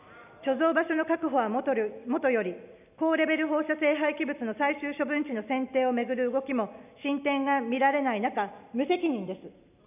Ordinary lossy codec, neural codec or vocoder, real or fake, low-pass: none; none; real; 3.6 kHz